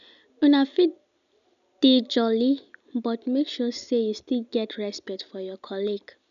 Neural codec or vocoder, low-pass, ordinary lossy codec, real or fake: none; 7.2 kHz; none; real